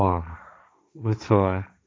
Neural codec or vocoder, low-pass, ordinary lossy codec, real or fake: codec, 16 kHz, 1.1 kbps, Voila-Tokenizer; none; none; fake